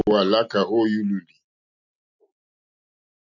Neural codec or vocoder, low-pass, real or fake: none; 7.2 kHz; real